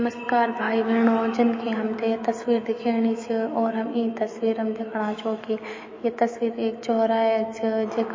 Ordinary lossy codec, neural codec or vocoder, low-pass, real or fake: MP3, 32 kbps; autoencoder, 48 kHz, 128 numbers a frame, DAC-VAE, trained on Japanese speech; 7.2 kHz; fake